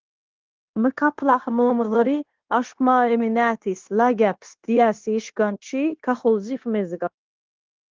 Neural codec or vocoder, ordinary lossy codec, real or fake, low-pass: codec, 16 kHz, 0.9 kbps, LongCat-Audio-Codec; Opus, 16 kbps; fake; 7.2 kHz